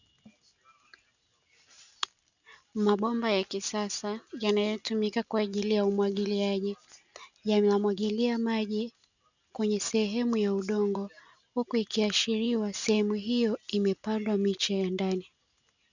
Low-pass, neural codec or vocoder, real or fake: 7.2 kHz; none; real